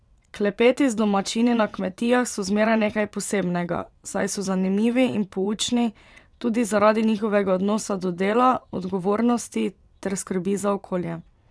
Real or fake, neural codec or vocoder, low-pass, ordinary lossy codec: fake; vocoder, 22.05 kHz, 80 mel bands, WaveNeXt; none; none